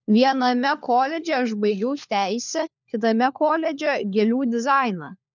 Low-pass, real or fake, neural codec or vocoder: 7.2 kHz; fake; codec, 16 kHz, 4 kbps, FunCodec, trained on LibriTTS, 50 frames a second